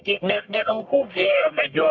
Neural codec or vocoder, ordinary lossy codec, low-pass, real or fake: codec, 44.1 kHz, 1.7 kbps, Pupu-Codec; MP3, 64 kbps; 7.2 kHz; fake